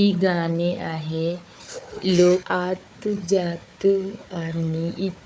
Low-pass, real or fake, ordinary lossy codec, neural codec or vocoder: none; fake; none; codec, 16 kHz, 4 kbps, FunCodec, trained on Chinese and English, 50 frames a second